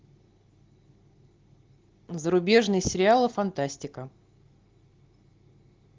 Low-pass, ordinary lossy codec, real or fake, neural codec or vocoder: 7.2 kHz; Opus, 16 kbps; real; none